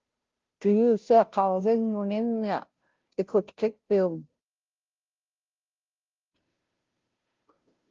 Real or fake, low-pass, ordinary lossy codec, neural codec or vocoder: fake; 7.2 kHz; Opus, 32 kbps; codec, 16 kHz, 0.5 kbps, FunCodec, trained on Chinese and English, 25 frames a second